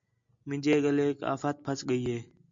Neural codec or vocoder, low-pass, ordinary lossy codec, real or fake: none; 7.2 kHz; MP3, 64 kbps; real